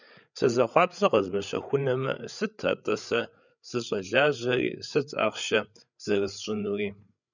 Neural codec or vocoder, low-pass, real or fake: codec, 16 kHz, 8 kbps, FreqCodec, larger model; 7.2 kHz; fake